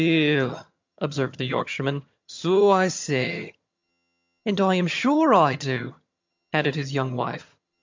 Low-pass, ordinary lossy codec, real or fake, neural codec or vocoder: 7.2 kHz; MP3, 64 kbps; fake; vocoder, 22.05 kHz, 80 mel bands, HiFi-GAN